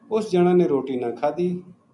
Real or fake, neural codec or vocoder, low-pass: real; none; 10.8 kHz